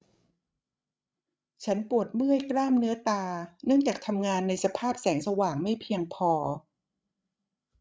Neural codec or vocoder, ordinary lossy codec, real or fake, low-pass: codec, 16 kHz, 16 kbps, FreqCodec, larger model; none; fake; none